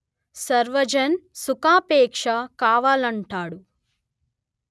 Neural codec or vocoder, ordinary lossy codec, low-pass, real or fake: none; none; none; real